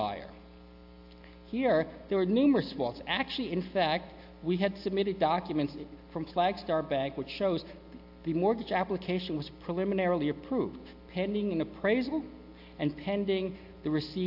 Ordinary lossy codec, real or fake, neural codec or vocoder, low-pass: AAC, 48 kbps; real; none; 5.4 kHz